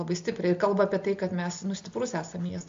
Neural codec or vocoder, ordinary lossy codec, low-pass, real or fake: none; MP3, 48 kbps; 7.2 kHz; real